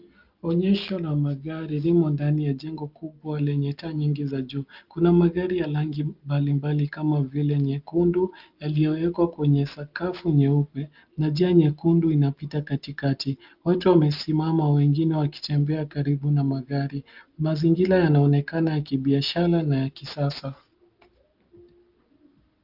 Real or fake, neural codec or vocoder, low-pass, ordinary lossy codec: real; none; 5.4 kHz; Opus, 32 kbps